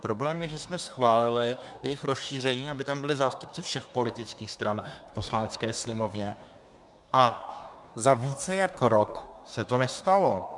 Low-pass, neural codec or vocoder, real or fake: 10.8 kHz; codec, 24 kHz, 1 kbps, SNAC; fake